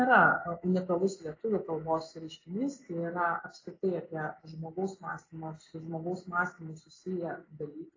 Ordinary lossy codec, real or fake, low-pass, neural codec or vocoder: AAC, 32 kbps; real; 7.2 kHz; none